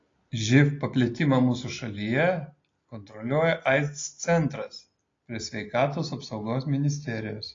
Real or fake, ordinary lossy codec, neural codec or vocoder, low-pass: real; AAC, 32 kbps; none; 7.2 kHz